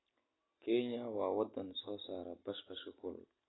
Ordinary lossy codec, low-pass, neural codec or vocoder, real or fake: AAC, 16 kbps; 7.2 kHz; none; real